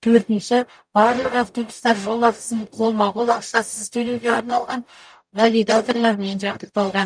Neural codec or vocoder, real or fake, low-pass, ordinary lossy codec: codec, 44.1 kHz, 0.9 kbps, DAC; fake; 9.9 kHz; none